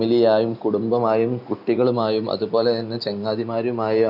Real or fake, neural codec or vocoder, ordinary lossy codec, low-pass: real; none; none; 5.4 kHz